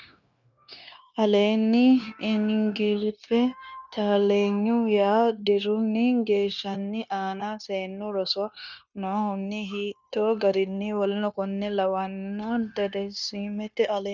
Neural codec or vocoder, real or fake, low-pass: codec, 16 kHz, 0.9 kbps, LongCat-Audio-Codec; fake; 7.2 kHz